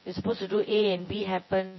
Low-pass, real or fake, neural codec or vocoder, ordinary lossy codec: 7.2 kHz; fake; vocoder, 24 kHz, 100 mel bands, Vocos; MP3, 24 kbps